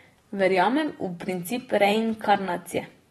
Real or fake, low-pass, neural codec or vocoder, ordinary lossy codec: fake; 19.8 kHz; vocoder, 44.1 kHz, 128 mel bands every 512 samples, BigVGAN v2; AAC, 32 kbps